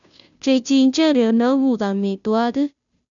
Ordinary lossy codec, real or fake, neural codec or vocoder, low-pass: none; fake; codec, 16 kHz, 0.5 kbps, FunCodec, trained on Chinese and English, 25 frames a second; 7.2 kHz